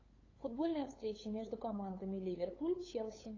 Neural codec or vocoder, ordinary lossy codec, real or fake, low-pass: codec, 16 kHz, 8 kbps, FunCodec, trained on LibriTTS, 25 frames a second; AAC, 32 kbps; fake; 7.2 kHz